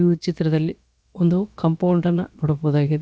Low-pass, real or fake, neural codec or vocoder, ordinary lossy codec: none; fake; codec, 16 kHz, about 1 kbps, DyCAST, with the encoder's durations; none